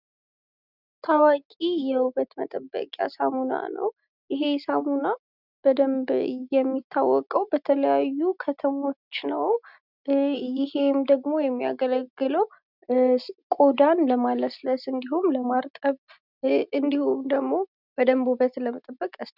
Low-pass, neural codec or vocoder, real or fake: 5.4 kHz; none; real